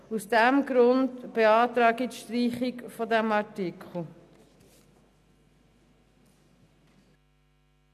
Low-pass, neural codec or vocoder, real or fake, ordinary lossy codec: 14.4 kHz; none; real; none